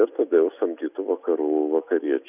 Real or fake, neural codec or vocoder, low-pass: real; none; 3.6 kHz